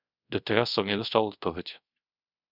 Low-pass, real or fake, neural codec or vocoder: 5.4 kHz; fake; codec, 24 kHz, 0.5 kbps, DualCodec